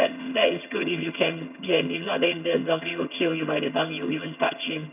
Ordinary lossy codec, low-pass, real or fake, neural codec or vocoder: none; 3.6 kHz; fake; vocoder, 22.05 kHz, 80 mel bands, HiFi-GAN